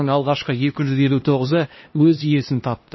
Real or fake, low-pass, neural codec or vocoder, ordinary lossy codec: fake; 7.2 kHz; codec, 16 kHz, 1 kbps, X-Codec, HuBERT features, trained on LibriSpeech; MP3, 24 kbps